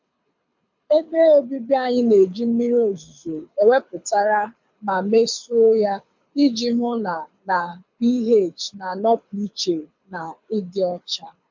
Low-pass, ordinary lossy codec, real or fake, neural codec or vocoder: 7.2 kHz; MP3, 64 kbps; fake; codec, 24 kHz, 6 kbps, HILCodec